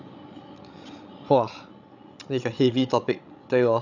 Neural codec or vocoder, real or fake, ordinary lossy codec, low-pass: codec, 16 kHz, 16 kbps, FreqCodec, larger model; fake; none; 7.2 kHz